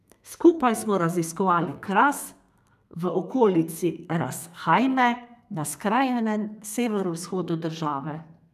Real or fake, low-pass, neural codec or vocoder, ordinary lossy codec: fake; 14.4 kHz; codec, 32 kHz, 1.9 kbps, SNAC; none